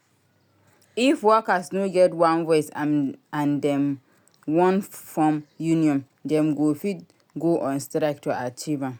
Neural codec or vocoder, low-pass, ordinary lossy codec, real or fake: none; none; none; real